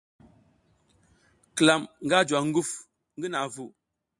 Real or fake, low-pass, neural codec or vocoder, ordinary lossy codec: real; 10.8 kHz; none; MP3, 96 kbps